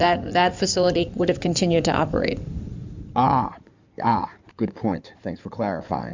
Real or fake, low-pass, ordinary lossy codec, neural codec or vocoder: fake; 7.2 kHz; MP3, 64 kbps; codec, 16 kHz in and 24 kHz out, 2.2 kbps, FireRedTTS-2 codec